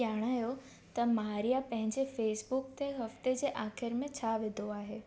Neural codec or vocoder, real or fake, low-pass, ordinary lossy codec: none; real; none; none